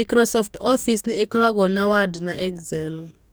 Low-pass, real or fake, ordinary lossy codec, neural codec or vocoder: none; fake; none; codec, 44.1 kHz, 2.6 kbps, DAC